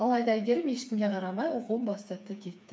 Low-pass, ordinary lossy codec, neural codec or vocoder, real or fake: none; none; codec, 16 kHz, 4 kbps, FreqCodec, smaller model; fake